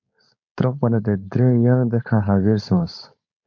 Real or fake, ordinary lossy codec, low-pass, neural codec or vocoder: fake; MP3, 64 kbps; 7.2 kHz; codec, 16 kHz, 4.8 kbps, FACodec